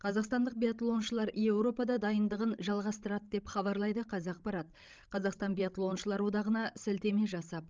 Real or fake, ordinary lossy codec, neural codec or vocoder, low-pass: fake; Opus, 24 kbps; codec, 16 kHz, 16 kbps, FreqCodec, larger model; 7.2 kHz